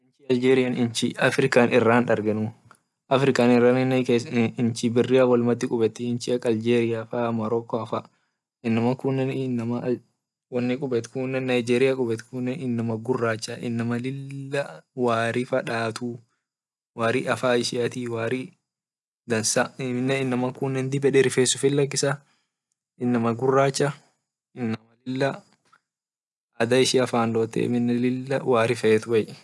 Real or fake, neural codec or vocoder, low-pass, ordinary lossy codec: real; none; none; none